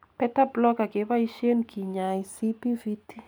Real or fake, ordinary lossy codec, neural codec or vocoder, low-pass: real; none; none; none